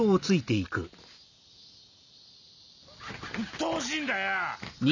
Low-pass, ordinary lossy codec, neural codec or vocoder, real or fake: 7.2 kHz; none; none; real